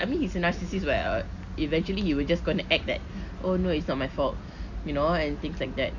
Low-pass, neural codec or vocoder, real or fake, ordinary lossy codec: 7.2 kHz; none; real; none